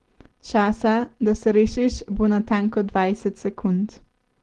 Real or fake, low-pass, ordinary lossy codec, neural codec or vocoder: real; 10.8 kHz; Opus, 16 kbps; none